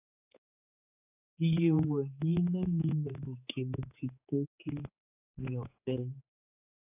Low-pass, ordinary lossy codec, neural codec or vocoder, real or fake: 3.6 kHz; AAC, 32 kbps; codec, 16 kHz, 4 kbps, X-Codec, HuBERT features, trained on general audio; fake